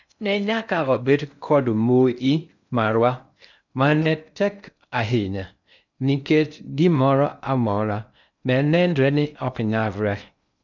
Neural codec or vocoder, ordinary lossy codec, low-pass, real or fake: codec, 16 kHz in and 24 kHz out, 0.6 kbps, FocalCodec, streaming, 2048 codes; none; 7.2 kHz; fake